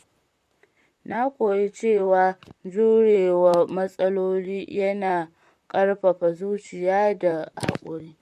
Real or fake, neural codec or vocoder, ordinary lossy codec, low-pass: fake; vocoder, 44.1 kHz, 128 mel bands, Pupu-Vocoder; MP3, 64 kbps; 14.4 kHz